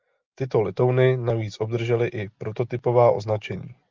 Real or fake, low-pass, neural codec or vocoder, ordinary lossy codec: real; 7.2 kHz; none; Opus, 32 kbps